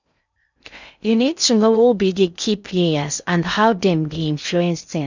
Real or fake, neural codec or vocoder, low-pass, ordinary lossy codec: fake; codec, 16 kHz in and 24 kHz out, 0.6 kbps, FocalCodec, streaming, 2048 codes; 7.2 kHz; none